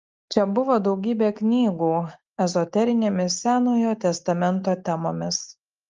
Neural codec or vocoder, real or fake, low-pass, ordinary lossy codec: none; real; 7.2 kHz; Opus, 32 kbps